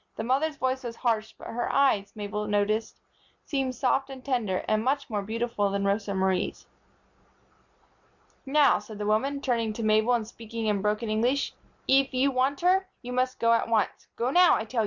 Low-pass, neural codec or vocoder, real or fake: 7.2 kHz; none; real